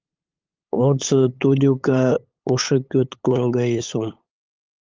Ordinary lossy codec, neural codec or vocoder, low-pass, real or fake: Opus, 32 kbps; codec, 16 kHz, 8 kbps, FunCodec, trained on LibriTTS, 25 frames a second; 7.2 kHz; fake